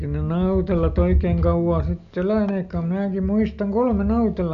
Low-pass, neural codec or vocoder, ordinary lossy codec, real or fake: 7.2 kHz; none; none; real